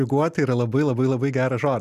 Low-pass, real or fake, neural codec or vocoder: 14.4 kHz; real; none